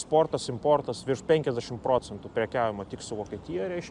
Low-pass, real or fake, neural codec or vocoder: 10.8 kHz; real; none